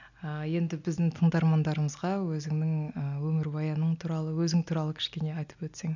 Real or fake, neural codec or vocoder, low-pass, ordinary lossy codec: real; none; 7.2 kHz; none